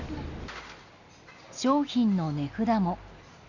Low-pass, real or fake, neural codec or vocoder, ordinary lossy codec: 7.2 kHz; real; none; none